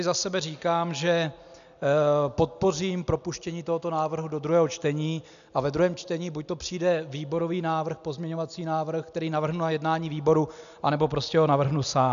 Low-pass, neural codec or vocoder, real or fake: 7.2 kHz; none; real